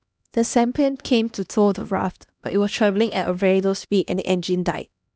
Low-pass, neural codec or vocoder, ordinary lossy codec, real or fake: none; codec, 16 kHz, 1 kbps, X-Codec, HuBERT features, trained on LibriSpeech; none; fake